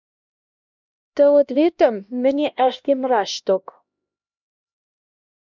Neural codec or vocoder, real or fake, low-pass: codec, 16 kHz, 1 kbps, X-Codec, HuBERT features, trained on LibriSpeech; fake; 7.2 kHz